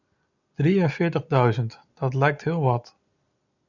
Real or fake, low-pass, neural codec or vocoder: real; 7.2 kHz; none